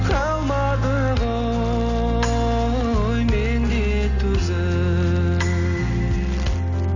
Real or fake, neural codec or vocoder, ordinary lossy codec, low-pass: real; none; none; 7.2 kHz